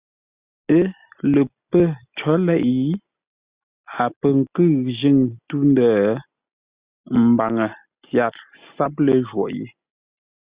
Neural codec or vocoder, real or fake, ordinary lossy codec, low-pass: none; real; Opus, 64 kbps; 3.6 kHz